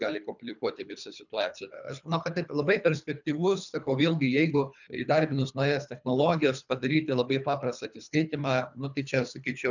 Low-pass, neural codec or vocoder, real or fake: 7.2 kHz; codec, 24 kHz, 3 kbps, HILCodec; fake